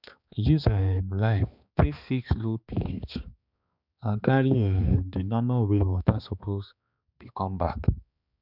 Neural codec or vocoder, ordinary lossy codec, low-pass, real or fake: codec, 16 kHz, 2 kbps, X-Codec, HuBERT features, trained on balanced general audio; none; 5.4 kHz; fake